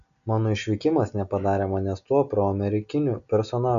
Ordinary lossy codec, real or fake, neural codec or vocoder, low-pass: MP3, 96 kbps; real; none; 7.2 kHz